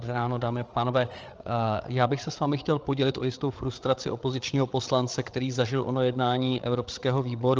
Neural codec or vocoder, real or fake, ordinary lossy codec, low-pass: codec, 16 kHz, 8 kbps, FreqCodec, larger model; fake; Opus, 24 kbps; 7.2 kHz